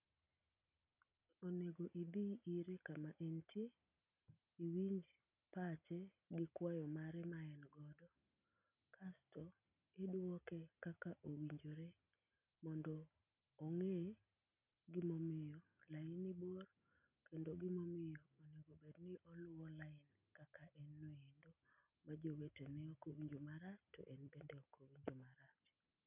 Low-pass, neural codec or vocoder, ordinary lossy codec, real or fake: 3.6 kHz; none; none; real